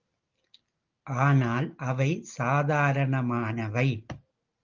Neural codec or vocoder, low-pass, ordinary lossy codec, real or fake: none; 7.2 kHz; Opus, 24 kbps; real